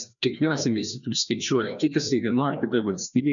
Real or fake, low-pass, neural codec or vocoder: fake; 7.2 kHz; codec, 16 kHz, 1 kbps, FreqCodec, larger model